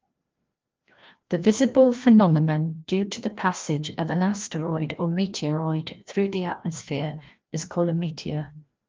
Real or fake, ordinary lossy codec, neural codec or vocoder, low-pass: fake; Opus, 24 kbps; codec, 16 kHz, 1 kbps, FreqCodec, larger model; 7.2 kHz